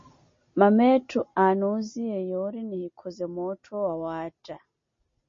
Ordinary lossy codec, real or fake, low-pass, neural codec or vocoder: MP3, 32 kbps; real; 7.2 kHz; none